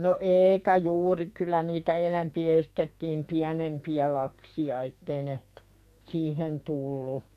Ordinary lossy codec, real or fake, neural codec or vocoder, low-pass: AAC, 96 kbps; fake; codec, 44.1 kHz, 2.6 kbps, SNAC; 14.4 kHz